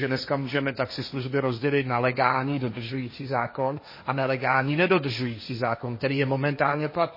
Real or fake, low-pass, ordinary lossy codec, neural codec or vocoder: fake; 5.4 kHz; MP3, 24 kbps; codec, 16 kHz, 1.1 kbps, Voila-Tokenizer